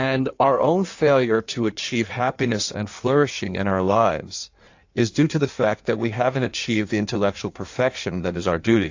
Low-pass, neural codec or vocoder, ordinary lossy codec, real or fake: 7.2 kHz; codec, 16 kHz in and 24 kHz out, 1.1 kbps, FireRedTTS-2 codec; AAC, 48 kbps; fake